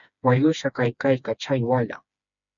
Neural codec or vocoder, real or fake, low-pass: codec, 16 kHz, 2 kbps, FreqCodec, smaller model; fake; 7.2 kHz